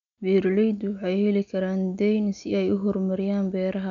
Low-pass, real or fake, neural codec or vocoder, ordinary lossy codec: 7.2 kHz; real; none; none